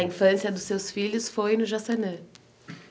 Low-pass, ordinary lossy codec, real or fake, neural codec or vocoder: none; none; real; none